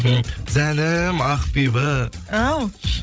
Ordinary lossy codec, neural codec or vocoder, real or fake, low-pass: none; codec, 16 kHz, 16 kbps, FreqCodec, larger model; fake; none